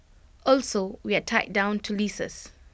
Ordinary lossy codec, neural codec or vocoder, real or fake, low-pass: none; none; real; none